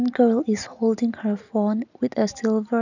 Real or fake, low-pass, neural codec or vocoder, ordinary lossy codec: real; 7.2 kHz; none; none